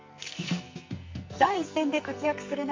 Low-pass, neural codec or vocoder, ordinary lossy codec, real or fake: 7.2 kHz; codec, 44.1 kHz, 2.6 kbps, SNAC; MP3, 48 kbps; fake